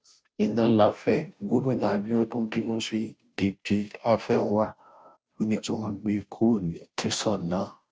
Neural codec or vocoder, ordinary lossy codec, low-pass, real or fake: codec, 16 kHz, 0.5 kbps, FunCodec, trained on Chinese and English, 25 frames a second; none; none; fake